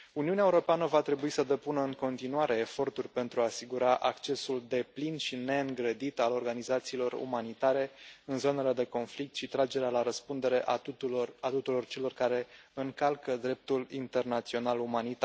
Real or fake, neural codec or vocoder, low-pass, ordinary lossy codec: real; none; none; none